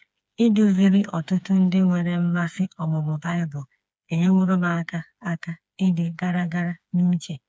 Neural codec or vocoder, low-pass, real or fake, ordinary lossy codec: codec, 16 kHz, 4 kbps, FreqCodec, smaller model; none; fake; none